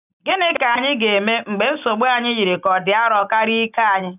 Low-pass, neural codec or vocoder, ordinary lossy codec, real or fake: 3.6 kHz; none; none; real